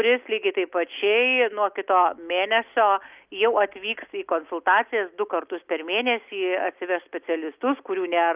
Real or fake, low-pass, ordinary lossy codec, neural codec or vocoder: real; 3.6 kHz; Opus, 24 kbps; none